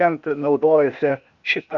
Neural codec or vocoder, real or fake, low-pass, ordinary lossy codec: codec, 16 kHz, 0.8 kbps, ZipCodec; fake; 7.2 kHz; MP3, 96 kbps